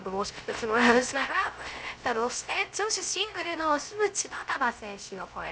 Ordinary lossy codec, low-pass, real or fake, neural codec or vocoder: none; none; fake; codec, 16 kHz, 0.3 kbps, FocalCodec